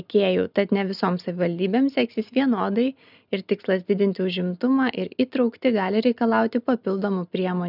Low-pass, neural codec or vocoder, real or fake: 5.4 kHz; none; real